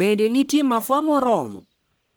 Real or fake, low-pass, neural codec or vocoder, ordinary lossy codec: fake; none; codec, 44.1 kHz, 1.7 kbps, Pupu-Codec; none